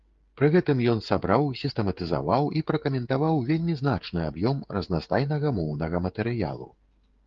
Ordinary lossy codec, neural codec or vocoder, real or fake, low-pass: Opus, 32 kbps; codec, 16 kHz, 16 kbps, FreqCodec, smaller model; fake; 7.2 kHz